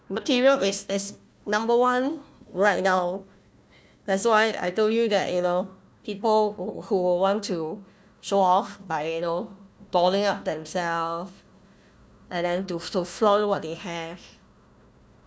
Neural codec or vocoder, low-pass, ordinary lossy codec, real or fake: codec, 16 kHz, 1 kbps, FunCodec, trained on Chinese and English, 50 frames a second; none; none; fake